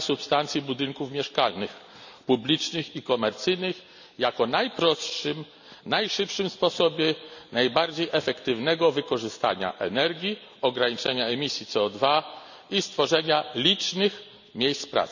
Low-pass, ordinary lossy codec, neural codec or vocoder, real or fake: 7.2 kHz; none; none; real